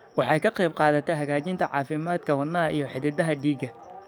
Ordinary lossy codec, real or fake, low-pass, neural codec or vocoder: none; fake; none; codec, 44.1 kHz, 7.8 kbps, DAC